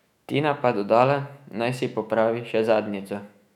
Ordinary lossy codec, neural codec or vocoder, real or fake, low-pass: none; autoencoder, 48 kHz, 128 numbers a frame, DAC-VAE, trained on Japanese speech; fake; 19.8 kHz